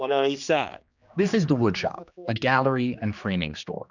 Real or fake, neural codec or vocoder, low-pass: fake; codec, 16 kHz, 2 kbps, X-Codec, HuBERT features, trained on general audio; 7.2 kHz